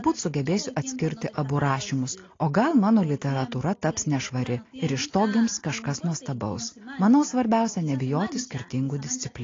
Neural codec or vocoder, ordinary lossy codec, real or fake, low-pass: none; AAC, 32 kbps; real; 7.2 kHz